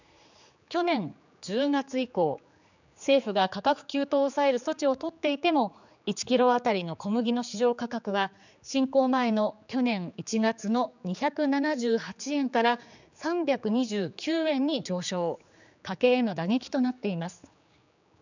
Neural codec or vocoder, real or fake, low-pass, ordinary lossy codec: codec, 16 kHz, 4 kbps, X-Codec, HuBERT features, trained on general audio; fake; 7.2 kHz; none